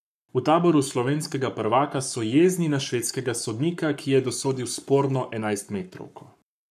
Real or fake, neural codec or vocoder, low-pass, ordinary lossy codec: fake; codec, 44.1 kHz, 7.8 kbps, Pupu-Codec; 14.4 kHz; none